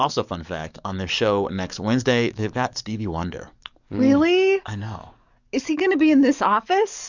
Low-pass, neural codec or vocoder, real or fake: 7.2 kHz; codec, 44.1 kHz, 7.8 kbps, DAC; fake